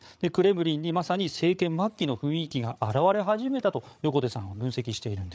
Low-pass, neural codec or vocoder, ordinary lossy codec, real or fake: none; codec, 16 kHz, 8 kbps, FreqCodec, larger model; none; fake